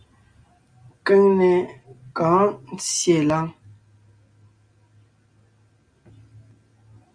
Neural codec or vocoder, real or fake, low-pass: none; real; 9.9 kHz